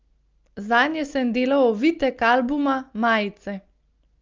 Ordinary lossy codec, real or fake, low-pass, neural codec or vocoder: Opus, 24 kbps; real; 7.2 kHz; none